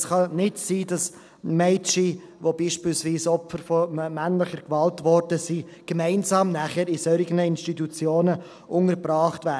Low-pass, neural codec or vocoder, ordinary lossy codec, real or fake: none; none; none; real